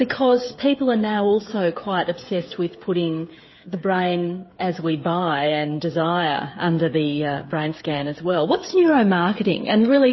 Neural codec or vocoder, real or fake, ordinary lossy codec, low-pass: codec, 16 kHz, 8 kbps, FreqCodec, smaller model; fake; MP3, 24 kbps; 7.2 kHz